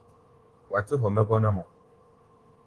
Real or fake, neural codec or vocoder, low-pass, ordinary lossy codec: fake; codec, 24 kHz, 1.2 kbps, DualCodec; 10.8 kHz; Opus, 16 kbps